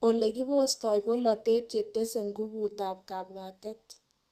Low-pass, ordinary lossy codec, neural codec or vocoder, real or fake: 14.4 kHz; Opus, 64 kbps; codec, 32 kHz, 1.9 kbps, SNAC; fake